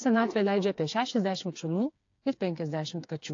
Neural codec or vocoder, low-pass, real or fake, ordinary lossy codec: codec, 16 kHz, 4 kbps, FreqCodec, smaller model; 7.2 kHz; fake; AAC, 48 kbps